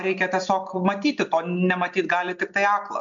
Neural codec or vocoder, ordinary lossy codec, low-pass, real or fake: none; MP3, 64 kbps; 7.2 kHz; real